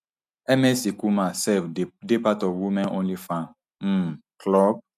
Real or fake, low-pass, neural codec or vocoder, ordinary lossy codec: real; 14.4 kHz; none; none